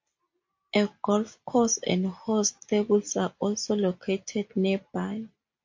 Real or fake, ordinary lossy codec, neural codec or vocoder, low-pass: real; MP3, 48 kbps; none; 7.2 kHz